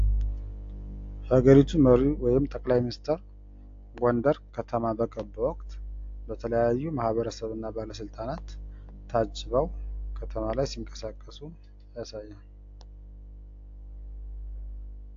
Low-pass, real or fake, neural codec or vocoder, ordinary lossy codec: 7.2 kHz; real; none; AAC, 48 kbps